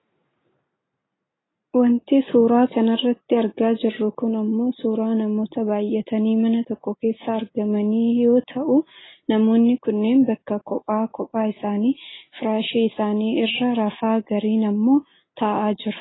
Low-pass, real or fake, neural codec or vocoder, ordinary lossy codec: 7.2 kHz; real; none; AAC, 16 kbps